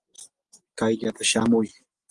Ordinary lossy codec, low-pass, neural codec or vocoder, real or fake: Opus, 24 kbps; 10.8 kHz; none; real